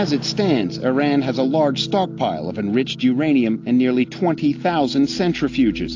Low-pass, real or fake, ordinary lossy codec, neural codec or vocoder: 7.2 kHz; real; AAC, 48 kbps; none